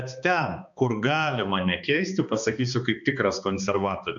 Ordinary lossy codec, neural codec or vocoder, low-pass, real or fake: MP3, 64 kbps; codec, 16 kHz, 2 kbps, X-Codec, HuBERT features, trained on balanced general audio; 7.2 kHz; fake